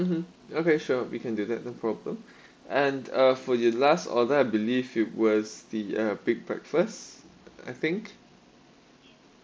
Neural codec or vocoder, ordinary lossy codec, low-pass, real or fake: none; none; none; real